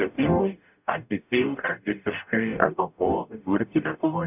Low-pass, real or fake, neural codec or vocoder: 3.6 kHz; fake; codec, 44.1 kHz, 0.9 kbps, DAC